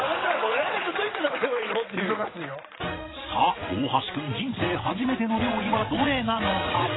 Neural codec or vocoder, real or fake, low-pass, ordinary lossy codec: vocoder, 22.05 kHz, 80 mel bands, WaveNeXt; fake; 7.2 kHz; AAC, 16 kbps